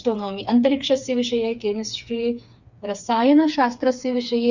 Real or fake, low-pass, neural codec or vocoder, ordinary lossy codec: fake; 7.2 kHz; codec, 16 kHz, 4 kbps, FreqCodec, smaller model; Opus, 64 kbps